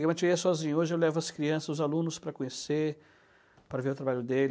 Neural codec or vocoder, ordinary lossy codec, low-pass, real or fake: none; none; none; real